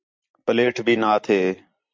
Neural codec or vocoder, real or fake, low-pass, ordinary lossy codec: vocoder, 44.1 kHz, 128 mel bands every 256 samples, BigVGAN v2; fake; 7.2 kHz; MP3, 64 kbps